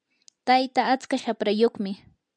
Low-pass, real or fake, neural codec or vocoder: 9.9 kHz; real; none